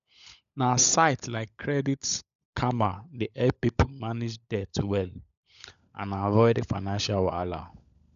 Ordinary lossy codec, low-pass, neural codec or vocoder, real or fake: none; 7.2 kHz; codec, 16 kHz, 16 kbps, FunCodec, trained on LibriTTS, 50 frames a second; fake